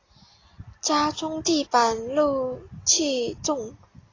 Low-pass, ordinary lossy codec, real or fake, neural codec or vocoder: 7.2 kHz; AAC, 32 kbps; real; none